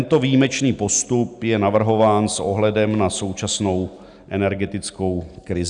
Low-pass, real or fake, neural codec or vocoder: 9.9 kHz; real; none